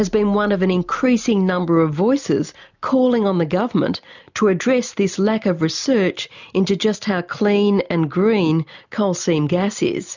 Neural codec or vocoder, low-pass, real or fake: none; 7.2 kHz; real